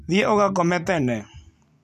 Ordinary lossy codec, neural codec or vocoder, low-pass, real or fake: none; none; 14.4 kHz; real